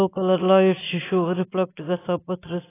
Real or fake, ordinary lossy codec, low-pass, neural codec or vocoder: real; AAC, 16 kbps; 3.6 kHz; none